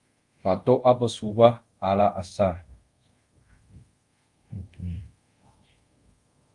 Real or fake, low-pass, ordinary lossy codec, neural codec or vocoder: fake; 10.8 kHz; Opus, 32 kbps; codec, 24 kHz, 0.5 kbps, DualCodec